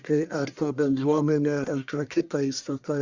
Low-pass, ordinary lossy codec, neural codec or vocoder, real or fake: 7.2 kHz; Opus, 64 kbps; codec, 44.1 kHz, 1.7 kbps, Pupu-Codec; fake